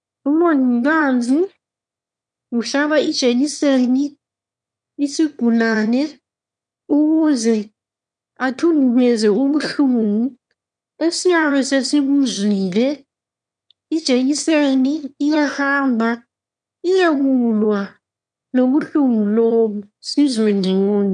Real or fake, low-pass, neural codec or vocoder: fake; 9.9 kHz; autoencoder, 22.05 kHz, a latent of 192 numbers a frame, VITS, trained on one speaker